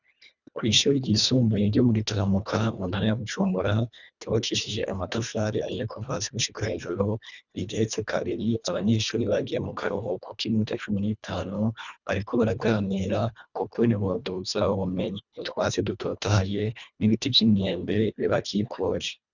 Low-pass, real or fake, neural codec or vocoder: 7.2 kHz; fake; codec, 24 kHz, 1.5 kbps, HILCodec